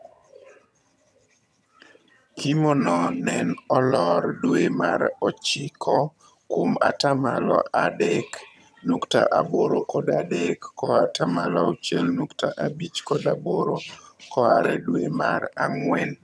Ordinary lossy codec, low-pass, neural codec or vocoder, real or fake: none; none; vocoder, 22.05 kHz, 80 mel bands, HiFi-GAN; fake